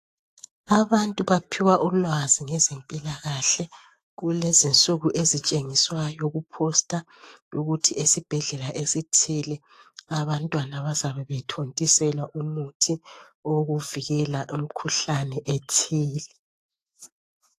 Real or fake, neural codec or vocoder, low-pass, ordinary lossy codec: fake; autoencoder, 48 kHz, 128 numbers a frame, DAC-VAE, trained on Japanese speech; 14.4 kHz; AAC, 48 kbps